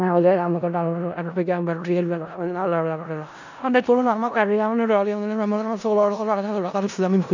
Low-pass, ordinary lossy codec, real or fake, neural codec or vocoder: 7.2 kHz; none; fake; codec, 16 kHz in and 24 kHz out, 0.4 kbps, LongCat-Audio-Codec, four codebook decoder